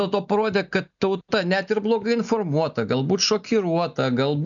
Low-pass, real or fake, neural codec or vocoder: 7.2 kHz; real; none